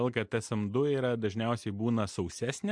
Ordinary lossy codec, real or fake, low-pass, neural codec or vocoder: MP3, 64 kbps; real; 9.9 kHz; none